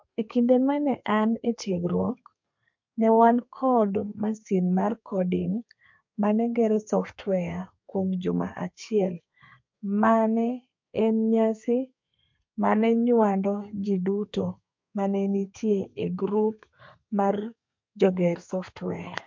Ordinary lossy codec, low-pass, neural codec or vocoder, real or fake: MP3, 48 kbps; 7.2 kHz; codec, 32 kHz, 1.9 kbps, SNAC; fake